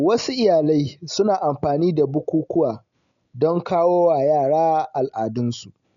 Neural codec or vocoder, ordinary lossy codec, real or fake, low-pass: none; none; real; 7.2 kHz